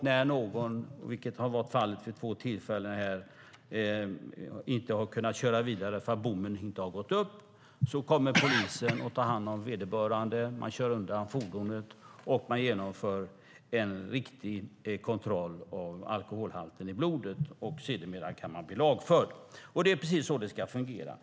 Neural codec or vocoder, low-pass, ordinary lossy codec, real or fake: none; none; none; real